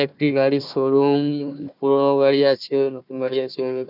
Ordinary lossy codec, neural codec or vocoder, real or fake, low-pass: none; codec, 16 kHz, 1 kbps, FunCodec, trained on Chinese and English, 50 frames a second; fake; 5.4 kHz